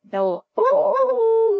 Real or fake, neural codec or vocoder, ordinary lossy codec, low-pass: fake; codec, 16 kHz, 0.5 kbps, FreqCodec, larger model; none; none